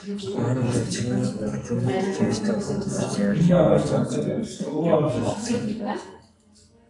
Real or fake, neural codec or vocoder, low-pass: fake; codec, 44.1 kHz, 2.6 kbps, SNAC; 10.8 kHz